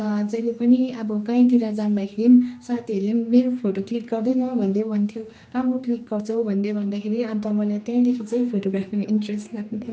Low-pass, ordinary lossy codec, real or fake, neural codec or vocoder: none; none; fake; codec, 16 kHz, 2 kbps, X-Codec, HuBERT features, trained on general audio